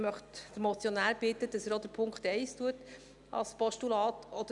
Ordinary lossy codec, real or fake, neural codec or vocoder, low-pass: none; real; none; 10.8 kHz